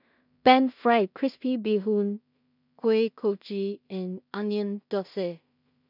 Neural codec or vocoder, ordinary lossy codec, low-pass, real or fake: codec, 16 kHz in and 24 kHz out, 0.4 kbps, LongCat-Audio-Codec, two codebook decoder; none; 5.4 kHz; fake